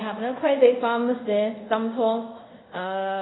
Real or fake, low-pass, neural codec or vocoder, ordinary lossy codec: fake; 7.2 kHz; codec, 24 kHz, 0.5 kbps, DualCodec; AAC, 16 kbps